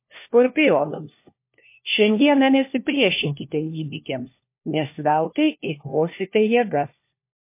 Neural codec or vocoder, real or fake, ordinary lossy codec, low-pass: codec, 16 kHz, 1 kbps, FunCodec, trained on LibriTTS, 50 frames a second; fake; MP3, 24 kbps; 3.6 kHz